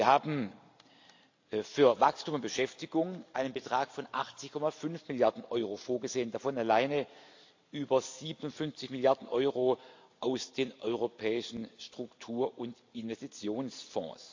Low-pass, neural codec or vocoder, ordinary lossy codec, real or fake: 7.2 kHz; none; AAC, 48 kbps; real